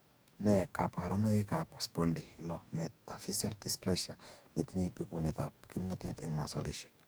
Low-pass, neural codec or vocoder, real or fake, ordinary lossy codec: none; codec, 44.1 kHz, 2.6 kbps, DAC; fake; none